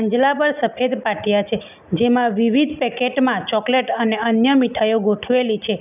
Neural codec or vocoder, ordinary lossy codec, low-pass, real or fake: none; none; 3.6 kHz; real